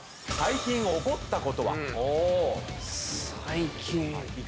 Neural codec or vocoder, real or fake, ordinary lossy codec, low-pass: none; real; none; none